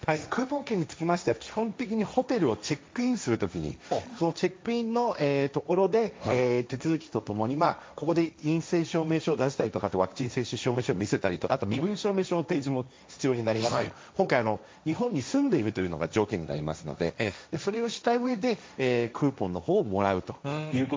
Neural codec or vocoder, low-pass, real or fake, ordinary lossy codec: codec, 16 kHz, 1.1 kbps, Voila-Tokenizer; none; fake; none